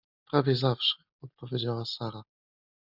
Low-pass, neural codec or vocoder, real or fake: 5.4 kHz; none; real